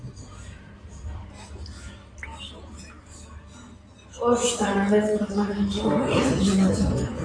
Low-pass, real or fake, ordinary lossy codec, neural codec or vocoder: 9.9 kHz; fake; AAC, 32 kbps; codec, 16 kHz in and 24 kHz out, 2.2 kbps, FireRedTTS-2 codec